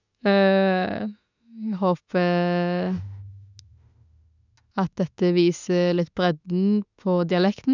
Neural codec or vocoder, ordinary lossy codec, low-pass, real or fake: autoencoder, 48 kHz, 32 numbers a frame, DAC-VAE, trained on Japanese speech; none; 7.2 kHz; fake